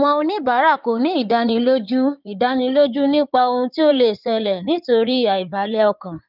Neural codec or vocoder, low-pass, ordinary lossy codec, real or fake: codec, 16 kHz in and 24 kHz out, 2.2 kbps, FireRedTTS-2 codec; 5.4 kHz; none; fake